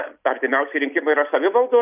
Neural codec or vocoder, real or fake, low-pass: none; real; 3.6 kHz